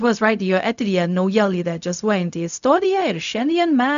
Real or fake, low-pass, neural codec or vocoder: fake; 7.2 kHz; codec, 16 kHz, 0.4 kbps, LongCat-Audio-Codec